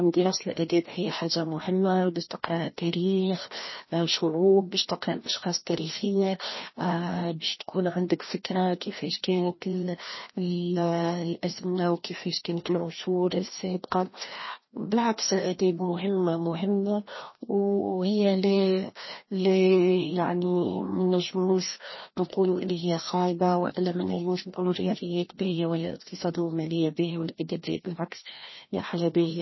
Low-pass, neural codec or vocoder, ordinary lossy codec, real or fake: 7.2 kHz; codec, 16 kHz, 1 kbps, FreqCodec, larger model; MP3, 24 kbps; fake